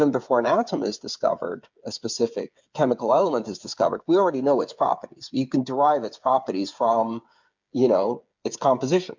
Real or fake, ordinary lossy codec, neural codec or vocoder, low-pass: fake; MP3, 64 kbps; codec, 16 kHz, 8 kbps, FreqCodec, smaller model; 7.2 kHz